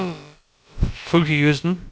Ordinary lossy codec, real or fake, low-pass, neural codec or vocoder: none; fake; none; codec, 16 kHz, about 1 kbps, DyCAST, with the encoder's durations